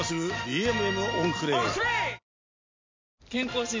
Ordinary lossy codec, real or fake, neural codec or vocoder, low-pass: MP3, 64 kbps; real; none; 7.2 kHz